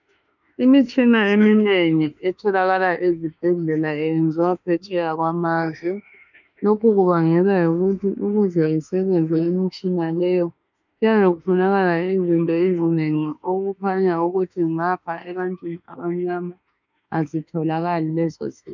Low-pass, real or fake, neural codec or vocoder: 7.2 kHz; fake; autoencoder, 48 kHz, 32 numbers a frame, DAC-VAE, trained on Japanese speech